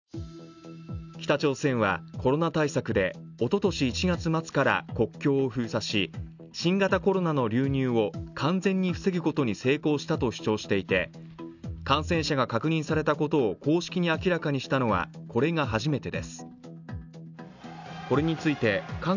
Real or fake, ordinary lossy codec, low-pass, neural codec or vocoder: real; none; 7.2 kHz; none